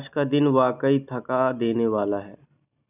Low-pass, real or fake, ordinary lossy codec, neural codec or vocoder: 3.6 kHz; real; none; none